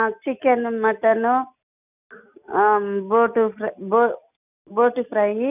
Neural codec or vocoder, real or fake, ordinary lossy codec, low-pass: none; real; none; 3.6 kHz